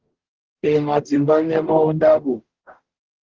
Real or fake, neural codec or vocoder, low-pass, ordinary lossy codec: fake; codec, 44.1 kHz, 0.9 kbps, DAC; 7.2 kHz; Opus, 16 kbps